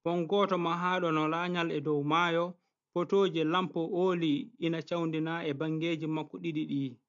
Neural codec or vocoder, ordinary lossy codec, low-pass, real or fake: none; AAC, 64 kbps; 7.2 kHz; real